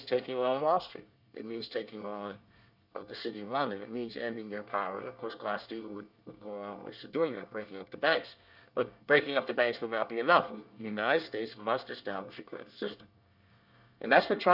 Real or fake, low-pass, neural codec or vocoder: fake; 5.4 kHz; codec, 24 kHz, 1 kbps, SNAC